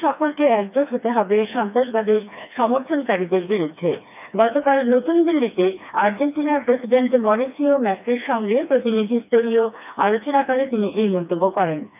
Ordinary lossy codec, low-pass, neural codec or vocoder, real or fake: none; 3.6 kHz; codec, 16 kHz, 2 kbps, FreqCodec, smaller model; fake